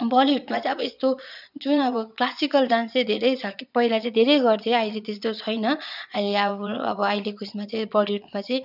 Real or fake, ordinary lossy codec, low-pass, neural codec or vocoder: fake; none; 5.4 kHz; vocoder, 22.05 kHz, 80 mel bands, Vocos